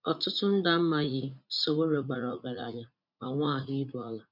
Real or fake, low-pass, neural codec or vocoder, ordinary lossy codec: fake; 5.4 kHz; vocoder, 44.1 kHz, 80 mel bands, Vocos; none